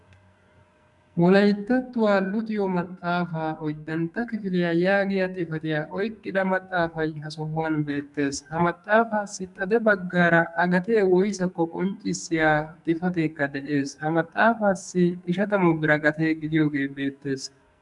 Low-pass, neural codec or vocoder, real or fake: 10.8 kHz; codec, 44.1 kHz, 2.6 kbps, SNAC; fake